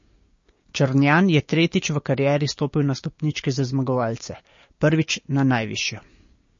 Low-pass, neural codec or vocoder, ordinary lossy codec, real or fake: 7.2 kHz; codec, 16 kHz, 6 kbps, DAC; MP3, 32 kbps; fake